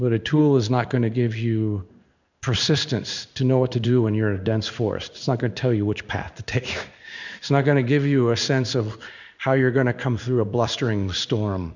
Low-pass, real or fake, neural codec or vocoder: 7.2 kHz; fake; codec, 16 kHz in and 24 kHz out, 1 kbps, XY-Tokenizer